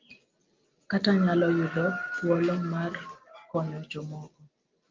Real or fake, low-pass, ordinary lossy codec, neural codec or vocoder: real; 7.2 kHz; Opus, 32 kbps; none